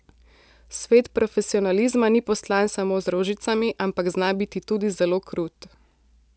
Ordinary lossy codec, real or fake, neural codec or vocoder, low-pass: none; real; none; none